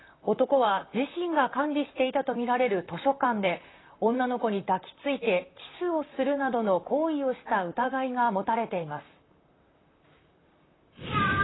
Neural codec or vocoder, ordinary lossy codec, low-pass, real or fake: vocoder, 44.1 kHz, 128 mel bands, Pupu-Vocoder; AAC, 16 kbps; 7.2 kHz; fake